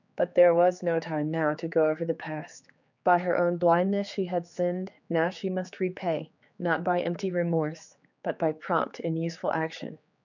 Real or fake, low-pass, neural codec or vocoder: fake; 7.2 kHz; codec, 16 kHz, 4 kbps, X-Codec, HuBERT features, trained on general audio